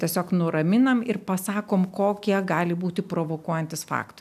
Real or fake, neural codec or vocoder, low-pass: real; none; 14.4 kHz